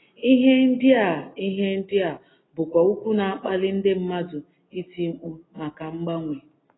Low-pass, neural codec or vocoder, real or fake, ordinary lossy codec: 7.2 kHz; none; real; AAC, 16 kbps